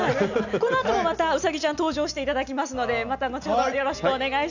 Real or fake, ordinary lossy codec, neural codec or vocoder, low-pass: real; none; none; 7.2 kHz